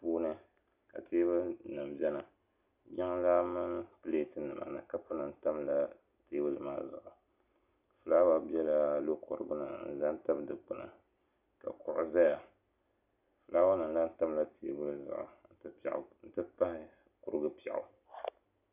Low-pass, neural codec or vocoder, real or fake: 3.6 kHz; none; real